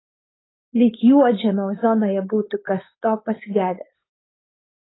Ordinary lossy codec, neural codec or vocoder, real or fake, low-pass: AAC, 16 kbps; codec, 16 kHz, 4.8 kbps, FACodec; fake; 7.2 kHz